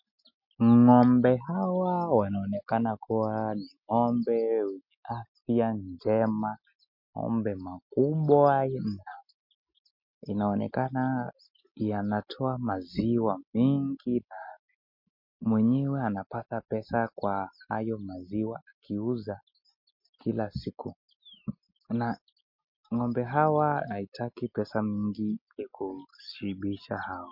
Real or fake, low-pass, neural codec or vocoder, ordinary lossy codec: real; 5.4 kHz; none; MP3, 32 kbps